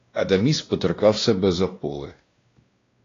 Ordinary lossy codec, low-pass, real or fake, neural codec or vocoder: AAC, 32 kbps; 7.2 kHz; fake; codec, 16 kHz, 1 kbps, X-Codec, WavLM features, trained on Multilingual LibriSpeech